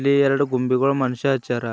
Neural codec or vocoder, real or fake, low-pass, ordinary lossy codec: none; real; none; none